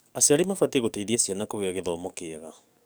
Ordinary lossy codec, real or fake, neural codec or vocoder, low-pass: none; fake; codec, 44.1 kHz, 7.8 kbps, DAC; none